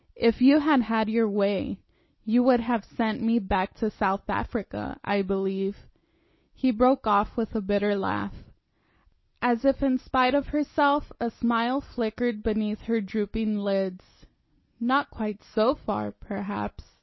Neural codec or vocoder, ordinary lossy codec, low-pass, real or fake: none; MP3, 24 kbps; 7.2 kHz; real